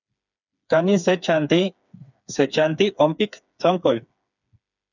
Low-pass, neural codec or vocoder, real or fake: 7.2 kHz; codec, 16 kHz, 4 kbps, FreqCodec, smaller model; fake